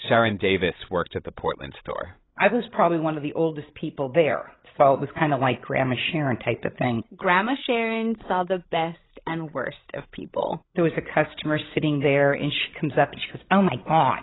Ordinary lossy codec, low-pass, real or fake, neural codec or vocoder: AAC, 16 kbps; 7.2 kHz; fake; codec, 16 kHz, 16 kbps, FreqCodec, larger model